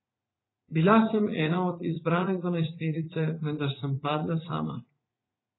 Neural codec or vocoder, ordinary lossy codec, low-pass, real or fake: vocoder, 44.1 kHz, 80 mel bands, Vocos; AAC, 16 kbps; 7.2 kHz; fake